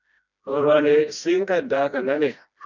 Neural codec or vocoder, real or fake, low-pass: codec, 16 kHz, 1 kbps, FreqCodec, smaller model; fake; 7.2 kHz